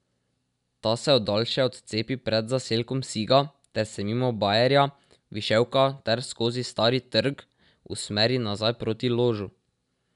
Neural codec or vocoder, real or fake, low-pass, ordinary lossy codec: none; real; 10.8 kHz; none